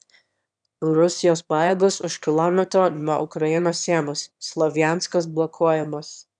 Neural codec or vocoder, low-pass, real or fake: autoencoder, 22.05 kHz, a latent of 192 numbers a frame, VITS, trained on one speaker; 9.9 kHz; fake